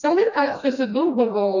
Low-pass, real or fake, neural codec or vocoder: 7.2 kHz; fake; codec, 16 kHz, 1 kbps, FreqCodec, smaller model